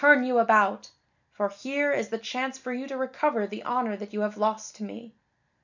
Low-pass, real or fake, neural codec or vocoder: 7.2 kHz; real; none